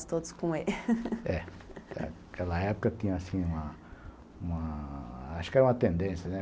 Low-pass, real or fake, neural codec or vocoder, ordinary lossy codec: none; real; none; none